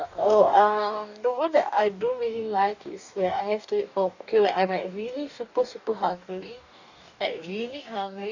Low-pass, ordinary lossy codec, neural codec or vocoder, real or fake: 7.2 kHz; none; codec, 44.1 kHz, 2.6 kbps, DAC; fake